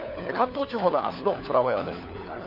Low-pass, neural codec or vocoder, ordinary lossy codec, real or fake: 5.4 kHz; codec, 16 kHz, 4 kbps, FunCodec, trained on LibriTTS, 50 frames a second; none; fake